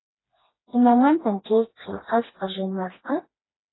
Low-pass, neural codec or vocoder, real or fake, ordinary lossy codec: 7.2 kHz; codec, 16 kHz, 2 kbps, FreqCodec, smaller model; fake; AAC, 16 kbps